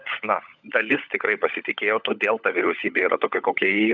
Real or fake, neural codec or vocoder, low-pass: fake; codec, 16 kHz, 16 kbps, FunCodec, trained on LibriTTS, 50 frames a second; 7.2 kHz